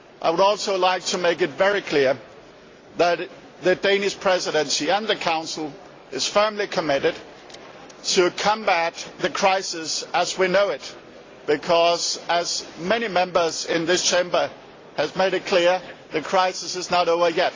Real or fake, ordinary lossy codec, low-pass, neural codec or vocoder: real; AAC, 32 kbps; 7.2 kHz; none